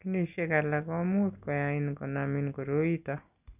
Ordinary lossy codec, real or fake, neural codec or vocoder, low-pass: none; real; none; 3.6 kHz